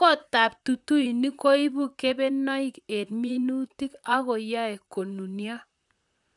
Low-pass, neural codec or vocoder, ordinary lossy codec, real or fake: 10.8 kHz; vocoder, 44.1 kHz, 128 mel bands, Pupu-Vocoder; none; fake